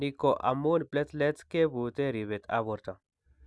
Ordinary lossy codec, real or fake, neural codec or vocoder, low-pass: none; real; none; none